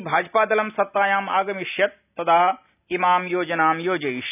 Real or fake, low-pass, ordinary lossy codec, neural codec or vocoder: real; 3.6 kHz; none; none